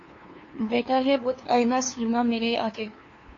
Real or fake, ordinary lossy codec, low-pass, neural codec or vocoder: fake; AAC, 32 kbps; 7.2 kHz; codec, 16 kHz, 2 kbps, FunCodec, trained on LibriTTS, 25 frames a second